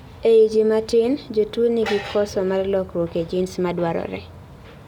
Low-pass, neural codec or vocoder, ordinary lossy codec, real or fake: 19.8 kHz; none; none; real